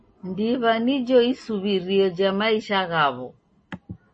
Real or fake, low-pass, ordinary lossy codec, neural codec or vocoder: real; 10.8 kHz; MP3, 32 kbps; none